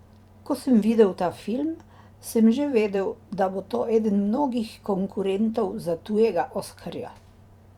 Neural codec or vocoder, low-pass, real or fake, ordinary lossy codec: vocoder, 44.1 kHz, 128 mel bands every 512 samples, BigVGAN v2; 19.8 kHz; fake; Opus, 64 kbps